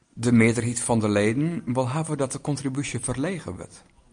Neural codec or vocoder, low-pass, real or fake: none; 9.9 kHz; real